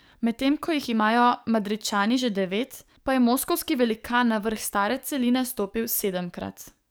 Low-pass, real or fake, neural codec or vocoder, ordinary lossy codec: none; fake; codec, 44.1 kHz, 7.8 kbps, DAC; none